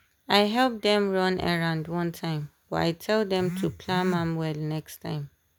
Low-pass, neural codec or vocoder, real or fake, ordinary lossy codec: none; none; real; none